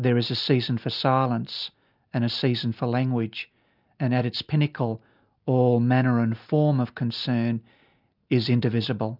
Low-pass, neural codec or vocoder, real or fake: 5.4 kHz; none; real